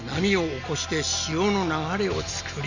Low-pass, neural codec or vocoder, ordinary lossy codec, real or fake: 7.2 kHz; none; none; real